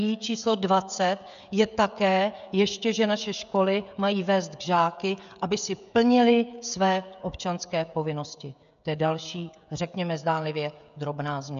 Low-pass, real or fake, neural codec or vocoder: 7.2 kHz; fake; codec, 16 kHz, 16 kbps, FreqCodec, smaller model